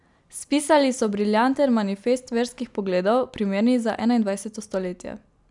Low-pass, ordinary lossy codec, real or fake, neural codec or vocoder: 10.8 kHz; none; real; none